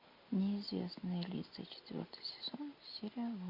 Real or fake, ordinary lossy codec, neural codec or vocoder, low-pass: real; MP3, 32 kbps; none; 5.4 kHz